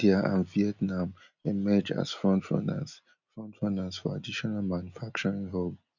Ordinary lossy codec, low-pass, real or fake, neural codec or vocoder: none; 7.2 kHz; real; none